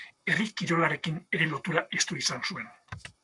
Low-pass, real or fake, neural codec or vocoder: 10.8 kHz; fake; codec, 44.1 kHz, 7.8 kbps, Pupu-Codec